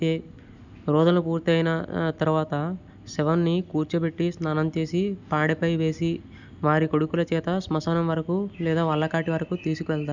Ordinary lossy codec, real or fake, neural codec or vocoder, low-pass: none; real; none; 7.2 kHz